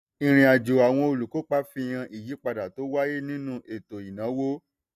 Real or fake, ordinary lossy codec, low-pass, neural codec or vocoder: real; none; 14.4 kHz; none